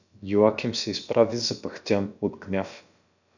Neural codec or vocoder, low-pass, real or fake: codec, 16 kHz, about 1 kbps, DyCAST, with the encoder's durations; 7.2 kHz; fake